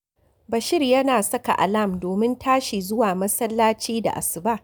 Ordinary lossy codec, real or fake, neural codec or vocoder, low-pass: none; real; none; none